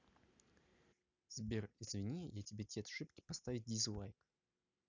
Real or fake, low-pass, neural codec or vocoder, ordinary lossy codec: real; 7.2 kHz; none; none